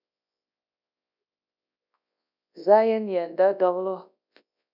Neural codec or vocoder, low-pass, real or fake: codec, 24 kHz, 0.9 kbps, WavTokenizer, large speech release; 5.4 kHz; fake